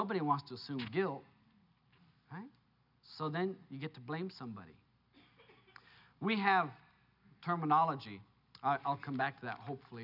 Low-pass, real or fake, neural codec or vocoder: 5.4 kHz; real; none